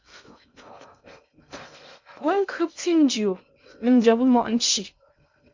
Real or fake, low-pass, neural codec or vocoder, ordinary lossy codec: fake; 7.2 kHz; codec, 16 kHz in and 24 kHz out, 0.6 kbps, FocalCodec, streaming, 2048 codes; MP3, 64 kbps